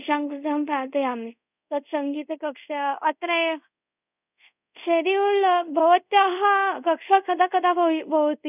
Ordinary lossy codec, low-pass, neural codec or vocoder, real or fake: none; 3.6 kHz; codec, 24 kHz, 0.5 kbps, DualCodec; fake